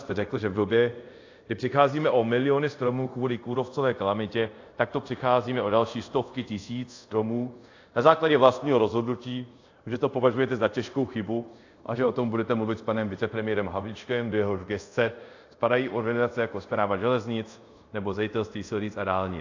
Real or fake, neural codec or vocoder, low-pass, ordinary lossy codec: fake; codec, 24 kHz, 0.5 kbps, DualCodec; 7.2 kHz; AAC, 48 kbps